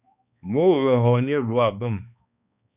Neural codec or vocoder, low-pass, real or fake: codec, 16 kHz, 2 kbps, X-Codec, HuBERT features, trained on balanced general audio; 3.6 kHz; fake